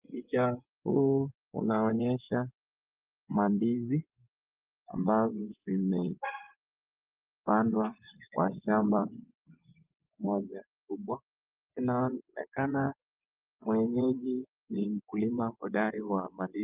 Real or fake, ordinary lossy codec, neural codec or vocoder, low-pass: fake; Opus, 32 kbps; vocoder, 24 kHz, 100 mel bands, Vocos; 3.6 kHz